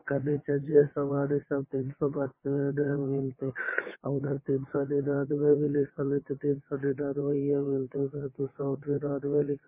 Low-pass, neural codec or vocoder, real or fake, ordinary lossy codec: 3.6 kHz; vocoder, 22.05 kHz, 80 mel bands, WaveNeXt; fake; MP3, 16 kbps